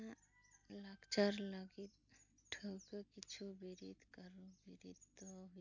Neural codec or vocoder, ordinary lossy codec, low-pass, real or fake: none; none; 7.2 kHz; real